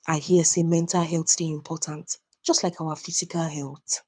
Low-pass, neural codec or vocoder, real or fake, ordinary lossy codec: 9.9 kHz; codec, 24 kHz, 6 kbps, HILCodec; fake; none